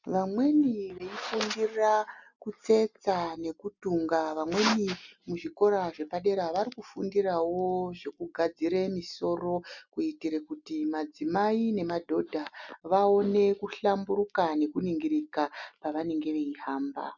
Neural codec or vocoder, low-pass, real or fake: none; 7.2 kHz; real